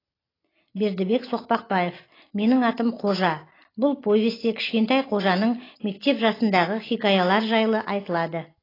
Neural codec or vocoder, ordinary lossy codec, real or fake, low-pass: none; AAC, 24 kbps; real; 5.4 kHz